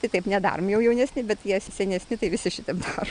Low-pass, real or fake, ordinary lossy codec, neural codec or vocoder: 9.9 kHz; real; Opus, 64 kbps; none